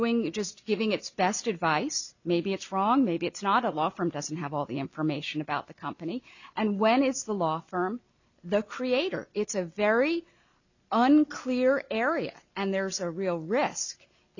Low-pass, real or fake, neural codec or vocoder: 7.2 kHz; real; none